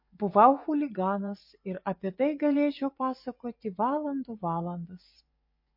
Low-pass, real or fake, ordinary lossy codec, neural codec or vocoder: 5.4 kHz; fake; MP3, 32 kbps; vocoder, 24 kHz, 100 mel bands, Vocos